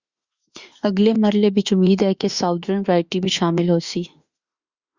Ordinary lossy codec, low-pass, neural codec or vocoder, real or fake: Opus, 64 kbps; 7.2 kHz; autoencoder, 48 kHz, 32 numbers a frame, DAC-VAE, trained on Japanese speech; fake